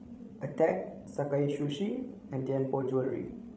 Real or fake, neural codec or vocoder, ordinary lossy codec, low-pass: fake; codec, 16 kHz, 16 kbps, FreqCodec, larger model; none; none